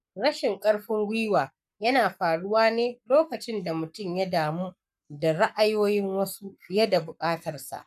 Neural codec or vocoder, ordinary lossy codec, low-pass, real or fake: codec, 44.1 kHz, 7.8 kbps, Pupu-Codec; none; 14.4 kHz; fake